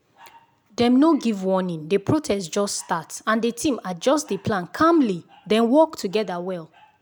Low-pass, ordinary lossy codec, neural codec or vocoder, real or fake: none; none; none; real